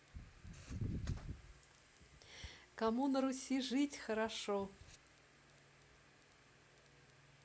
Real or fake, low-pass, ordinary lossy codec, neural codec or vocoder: real; none; none; none